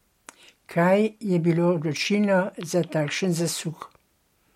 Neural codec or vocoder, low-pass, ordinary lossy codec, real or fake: none; 19.8 kHz; MP3, 64 kbps; real